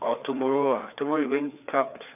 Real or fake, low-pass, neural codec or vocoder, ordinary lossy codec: fake; 3.6 kHz; codec, 16 kHz, 4 kbps, FreqCodec, larger model; none